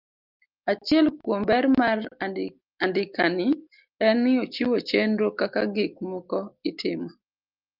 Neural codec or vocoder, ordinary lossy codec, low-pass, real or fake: none; Opus, 24 kbps; 5.4 kHz; real